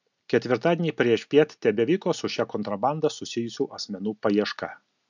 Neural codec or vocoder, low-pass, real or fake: none; 7.2 kHz; real